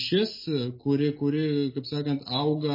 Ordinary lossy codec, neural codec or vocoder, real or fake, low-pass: MP3, 24 kbps; none; real; 5.4 kHz